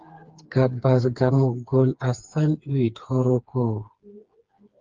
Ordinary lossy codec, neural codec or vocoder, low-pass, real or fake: Opus, 24 kbps; codec, 16 kHz, 4 kbps, FreqCodec, smaller model; 7.2 kHz; fake